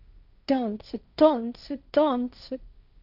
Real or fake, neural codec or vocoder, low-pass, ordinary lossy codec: fake; codec, 16 kHz, 1.1 kbps, Voila-Tokenizer; 5.4 kHz; none